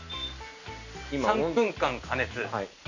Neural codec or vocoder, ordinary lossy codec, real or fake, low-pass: none; none; real; 7.2 kHz